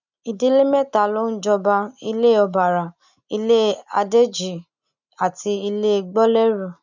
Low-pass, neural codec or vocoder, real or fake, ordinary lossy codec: 7.2 kHz; none; real; none